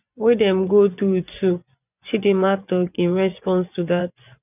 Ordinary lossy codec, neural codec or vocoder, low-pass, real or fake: none; vocoder, 24 kHz, 100 mel bands, Vocos; 3.6 kHz; fake